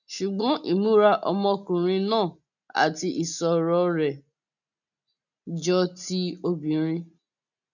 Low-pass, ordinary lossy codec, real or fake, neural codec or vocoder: 7.2 kHz; none; real; none